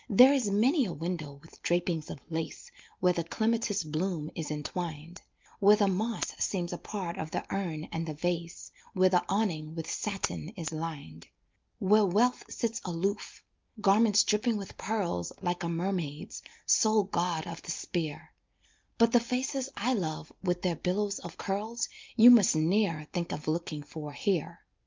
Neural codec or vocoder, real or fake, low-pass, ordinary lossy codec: none; real; 7.2 kHz; Opus, 24 kbps